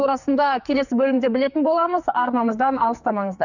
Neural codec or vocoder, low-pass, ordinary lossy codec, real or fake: codec, 44.1 kHz, 2.6 kbps, SNAC; 7.2 kHz; none; fake